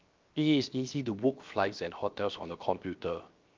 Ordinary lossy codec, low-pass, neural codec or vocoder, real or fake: Opus, 24 kbps; 7.2 kHz; codec, 16 kHz, 0.7 kbps, FocalCodec; fake